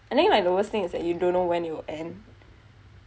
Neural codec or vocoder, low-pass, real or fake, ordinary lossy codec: none; none; real; none